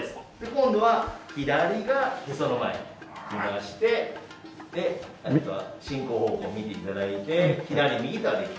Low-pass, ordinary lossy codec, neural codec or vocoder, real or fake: none; none; none; real